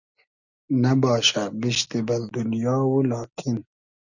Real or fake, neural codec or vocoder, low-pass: real; none; 7.2 kHz